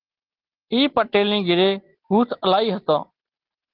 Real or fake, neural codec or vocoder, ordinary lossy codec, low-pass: real; none; Opus, 16 kbps; 5.4 kHz